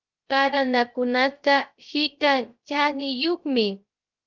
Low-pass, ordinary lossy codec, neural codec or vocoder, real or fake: 7.2 kHz; Opus, 24 kbps; codec, 16 kHz, 0.3 kbps, FocalCodec; fake